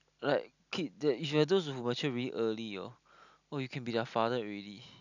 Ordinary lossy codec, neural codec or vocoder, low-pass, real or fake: none; none; 7.2 kHz; real